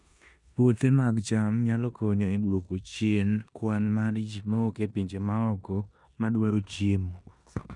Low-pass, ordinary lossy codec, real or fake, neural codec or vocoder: 10.8 kHz; none; fake; codec, 16 kHz in and 24 kHz out, 0.9 kbps, LongCat-Audio-Codec, four codebook decoder